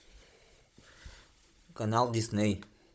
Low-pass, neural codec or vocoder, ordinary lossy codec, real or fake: none; codec, 16 kHz, 16 kbps, FunCodec, trained on Chinese and English, 50 frames a second; none; fake